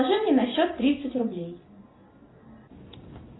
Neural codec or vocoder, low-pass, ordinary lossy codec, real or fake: none; 7.2 kHz; AAC, 16 kbps; real